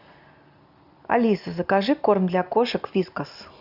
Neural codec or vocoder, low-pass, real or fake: none; 5.4 kHz; real